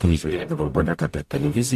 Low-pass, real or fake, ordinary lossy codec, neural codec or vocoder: 14.4 kHz; fake; MP3, 64 kbps; codec, 44.1 kHz, 0.9 kbps, DAC